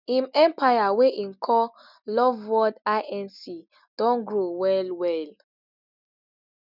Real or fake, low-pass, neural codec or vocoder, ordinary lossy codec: real; 5.4 kHz; none; none